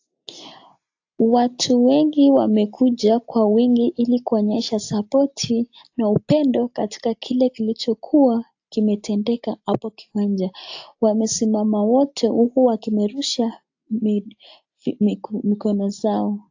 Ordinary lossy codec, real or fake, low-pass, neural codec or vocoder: AAC, 48 kbps; real; 7.2 kHz; none